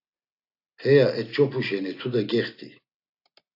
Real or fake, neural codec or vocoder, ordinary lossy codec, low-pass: real; none; AAC, 32 kbps; 5.4 kHz